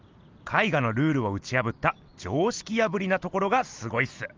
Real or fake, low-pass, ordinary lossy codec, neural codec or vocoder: real; 7.2 kHz; Opus, 32 kbps; none